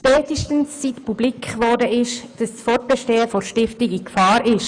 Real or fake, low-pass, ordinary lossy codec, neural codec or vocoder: fake; 9.9 kHz; none; autoencoder, 48 kHz, 128 numbers a frame, DAC-VAE, trained on Japanese speech